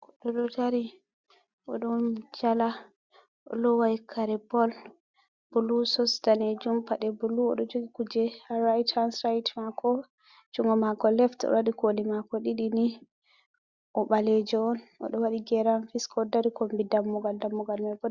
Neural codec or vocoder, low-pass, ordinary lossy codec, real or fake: none; 7.2 kHz; Opus, 64 kbps; real